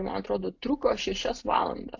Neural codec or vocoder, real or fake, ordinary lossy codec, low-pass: none; real; AAC, 48 kbps; 7.2 kHz